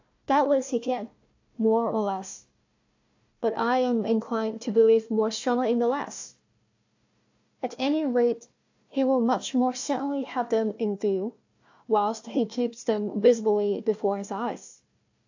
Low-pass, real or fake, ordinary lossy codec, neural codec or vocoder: 7.2 kHz; fake; AAC, 48 kbps; codec, 16 kHz, 1 kbps, FunCodec, trained on Chinese and English, 50 frames a second